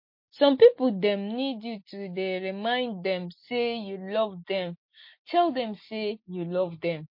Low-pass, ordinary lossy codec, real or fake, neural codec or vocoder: 5.4 kHz; MP3, 32 kbps; real; none